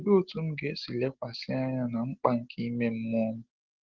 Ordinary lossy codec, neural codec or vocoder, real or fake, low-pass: Opus, 16 kbps; none; real; 7.2 kHz